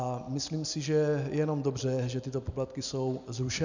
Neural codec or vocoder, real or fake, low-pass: none; real; 7.2 kHz